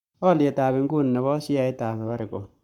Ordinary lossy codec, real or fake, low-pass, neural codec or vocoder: none; fake; 19.8 kHz; codec, 44.1 kHz, 7.8 kbps, Pupu-Codec